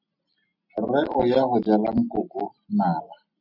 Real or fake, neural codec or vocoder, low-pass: real; none; 5.4 kHz